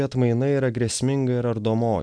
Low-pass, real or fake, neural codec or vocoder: 9.9 kHz; real; none